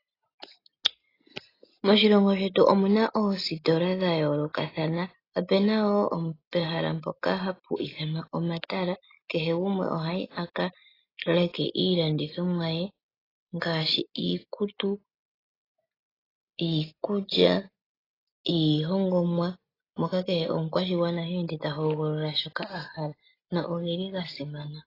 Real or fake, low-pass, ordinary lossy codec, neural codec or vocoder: real; 5.4 kHz; AAC, 24 kbps; none